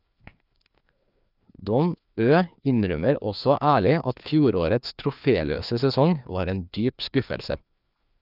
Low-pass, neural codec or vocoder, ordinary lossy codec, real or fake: 5.4 kHz; codec, 16 kHz, 2 kbps, FreqCodec, larger model; none; fake